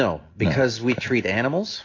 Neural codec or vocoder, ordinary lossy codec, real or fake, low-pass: none; AAC, 32 kbps; real; 7.2 kHz